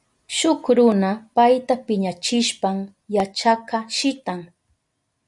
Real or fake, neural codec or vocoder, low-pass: real; none; 10.8 kHz